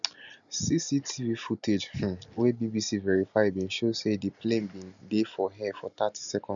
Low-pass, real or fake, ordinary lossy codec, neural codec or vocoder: 7.2 kHz; real; none; none